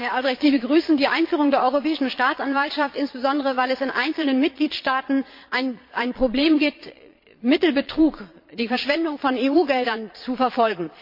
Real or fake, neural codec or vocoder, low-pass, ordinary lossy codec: fake; vocoder, 22.05 kHz, 80 mel bands, Vocos; 5.4 kHz; MP3, 32 kbps